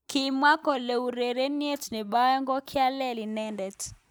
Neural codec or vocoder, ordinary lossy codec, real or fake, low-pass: none; none; real; none